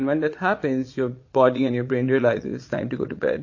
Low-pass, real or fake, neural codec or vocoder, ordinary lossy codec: 7.2 kHz; fake; vocoder, 22.05 kHz, 80 mel bands, Vocos; MP3, 32 kbps